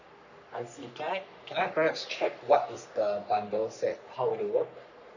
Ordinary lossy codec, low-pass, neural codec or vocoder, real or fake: none; 7.2 kHz; codec, 44.1 kHz, 3.4 kbps, Pupu-Codec; fake